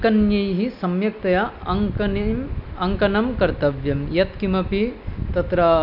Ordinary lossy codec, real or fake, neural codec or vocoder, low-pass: none; real; none; 5.4 kHz